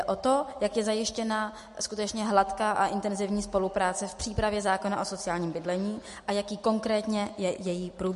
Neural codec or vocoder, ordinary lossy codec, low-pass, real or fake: none; MP3, 48 kbps; 14.4 kHz; real